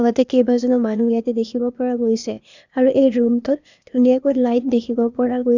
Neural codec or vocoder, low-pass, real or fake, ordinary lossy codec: codec, 16 kHz, 0.8 kbps, ZipCodec; 7.2 kHz; fake; none